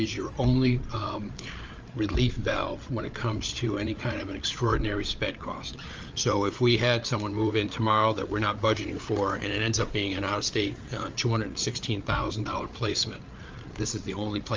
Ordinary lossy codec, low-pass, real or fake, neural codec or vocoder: Opus, 24 kbps; 7.2 kHz; fake; codec, 16 kHz, 8 kbps, FreqCodec, larger model